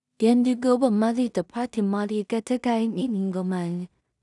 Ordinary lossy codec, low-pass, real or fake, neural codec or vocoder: none; 10.8 kHz; fake; codec, 16 kHz in and 24 kHz out, 0.4 kbps, LongCat-Audio-Codec, two codebook decoder